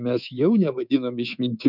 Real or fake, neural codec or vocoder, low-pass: fake; codec, 24 kHz, 3.1 kbps, DualCodec; 5.4 kHz